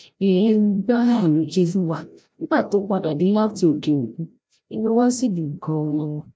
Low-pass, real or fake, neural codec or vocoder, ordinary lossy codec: none; fake; codec, 16 kHz, 0.5 kbps, FreqCodec, larger model; none